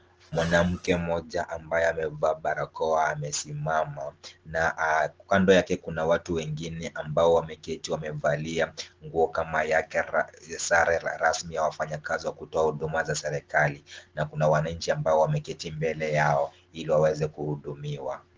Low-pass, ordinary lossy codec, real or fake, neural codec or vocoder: 7.2 kHz; Opus, 16 kbps; real; none